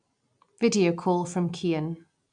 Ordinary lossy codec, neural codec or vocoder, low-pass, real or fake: none; none; 9.9 kHz; real